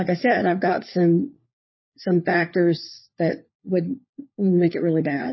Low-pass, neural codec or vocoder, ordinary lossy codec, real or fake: 7.2 kHz; codec, 16 kHz, 4 kbps, FunCodec, trained on LibriTTS, 50 frames a second; MP3, 24 kbps; fake